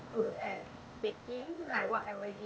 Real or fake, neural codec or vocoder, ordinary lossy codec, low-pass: fake; codec, 16 kHz, 0.8 kbps, ZipCodec; none; none